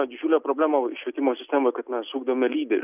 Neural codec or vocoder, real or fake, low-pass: none; real; 3.6 kHz